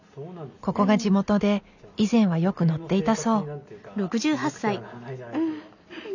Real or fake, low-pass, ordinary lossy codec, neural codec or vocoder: real; 7.2 kHz; none; none